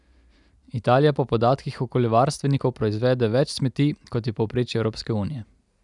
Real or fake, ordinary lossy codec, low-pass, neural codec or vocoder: real; none; 10.8 kHz; none